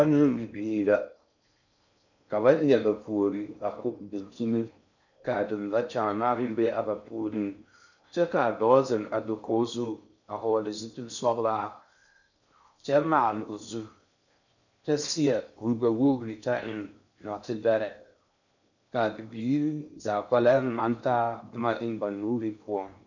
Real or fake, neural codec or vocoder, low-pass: fake; codec, 16 kHz in and 24 kHz out, 0.6 kbps, FocalCodec, streaming, 4096 codes; 7.2 kHz